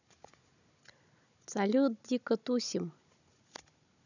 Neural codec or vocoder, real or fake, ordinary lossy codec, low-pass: codec, 16 kHz, 16 kbps, FunCodec, trained on Chinese and English, 50 frames a second; fake; none; 7.2 kHz